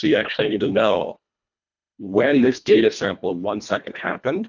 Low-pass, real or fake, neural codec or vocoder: 7.2 kHz; fake; codec, 24 kHz, 1.5 kbps, HILCodec